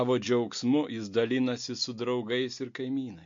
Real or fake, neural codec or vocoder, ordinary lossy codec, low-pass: real; none; MP3, 48 kbps; 7.2 kHz